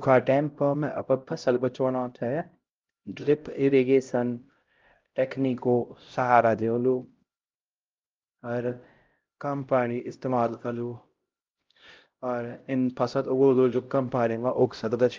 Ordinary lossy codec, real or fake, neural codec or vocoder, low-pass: Opus, 32 kbps; fake; codec, 16 kHz, 0.5 kbps, X-Codec, HuBERT features, trained on LibriSpeech; 7.2 kHz